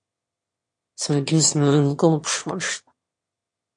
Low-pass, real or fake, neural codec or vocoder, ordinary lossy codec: 9.9 kHz; fake; autoencoder, 22.05 kHz, a latent of 192 numbers a frame, VITS, trained on one speaker; MP3, 48 kbps